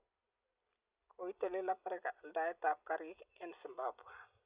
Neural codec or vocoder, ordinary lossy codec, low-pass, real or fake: none; Opus, 64 kbps; 3.6 kHz; real